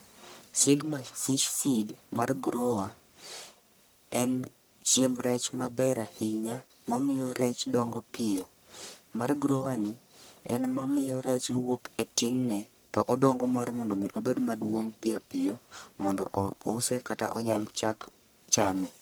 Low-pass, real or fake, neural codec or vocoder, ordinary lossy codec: none; fake; codec, 44.1 kHz, 1.7 kbps, Pupu-Codec; none